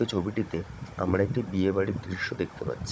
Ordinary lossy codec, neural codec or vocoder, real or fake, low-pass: none; codec, 16 kHz, 16 kbps, FunCodec, trained on Chinese and English, 50 frames a second; fake; none